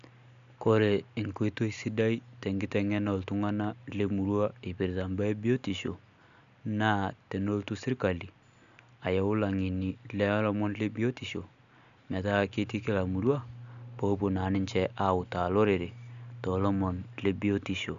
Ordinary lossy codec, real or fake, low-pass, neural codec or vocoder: none; real; 7.2 kHz; none